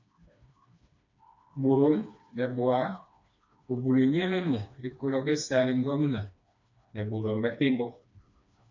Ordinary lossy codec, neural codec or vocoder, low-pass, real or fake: MP3, 64 kbps; codec, 16 kHz, 2 kbps, FreqCodec, smaller model; 7.2 kHz; fake